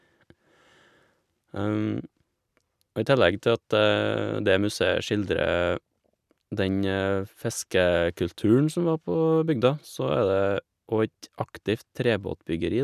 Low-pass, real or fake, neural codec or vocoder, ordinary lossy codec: 14.4 kHz; real; none; none